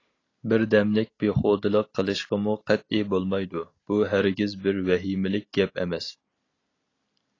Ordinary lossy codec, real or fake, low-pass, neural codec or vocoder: AAC, 32 kbps; real; 7.2 kHz; none